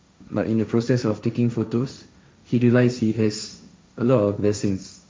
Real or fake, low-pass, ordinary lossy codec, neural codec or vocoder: fake; none; none; codec, 16 kHz, 1.1 kbps, Voila-Tokenizer